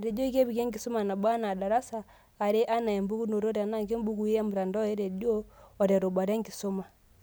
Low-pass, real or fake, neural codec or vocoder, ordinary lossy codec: none; real; none; none